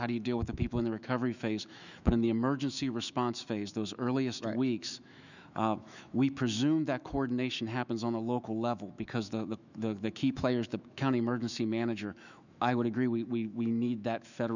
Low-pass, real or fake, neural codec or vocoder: 7.2 kHz; fake; autoencoder, 48 kHz, 128 numbers a frame, DAC-VAE, trained on Japanese speech